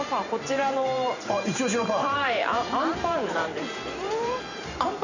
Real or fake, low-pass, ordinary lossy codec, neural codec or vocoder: fake; 7.2 kHz; none; vocoder, 44.1 kHz, 128 mel bands every 512 samples, BigVGAN v2